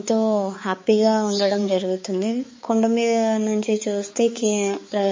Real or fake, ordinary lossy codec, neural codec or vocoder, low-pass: fake; MP3, 32 kbps; codec, 16 kHz, 4 kbps, X-Codec, HuBERT features, trained on balanced general audio; 7.2 kHz